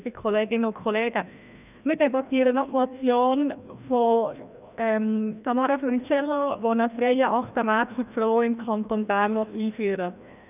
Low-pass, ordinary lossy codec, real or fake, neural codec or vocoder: 3.6 kHz; none; fake; codec, 16 kHz, 1 kbps, FreqCodec, larger model